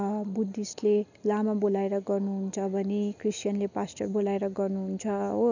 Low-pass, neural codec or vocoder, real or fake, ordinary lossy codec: 7.2 kHz; none; real; none